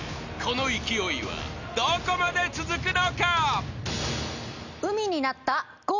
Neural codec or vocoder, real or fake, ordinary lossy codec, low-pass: none; real; none; 7.2 kHz